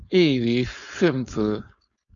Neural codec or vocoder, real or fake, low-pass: codec, 16 kHz, 4.8 kbps, FACodec; fake; 7.2 kHz